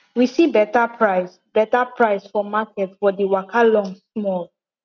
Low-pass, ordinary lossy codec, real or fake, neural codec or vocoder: 7.2 kHz; none; real; none